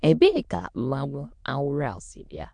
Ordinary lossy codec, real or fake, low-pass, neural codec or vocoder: MP3, 64 kbps; fake; 9.9 kHz; autoencoder, 22.05 kHz, a latent of 192 numbers a frame, VITS, trained on many speakers